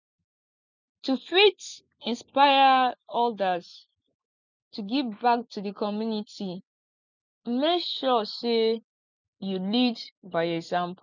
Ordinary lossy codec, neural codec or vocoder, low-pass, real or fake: none; none; 7.2 kHz; real